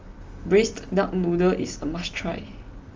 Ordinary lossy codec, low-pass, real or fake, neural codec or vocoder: Opus, 32 kbps; 7.2 kHz; real; none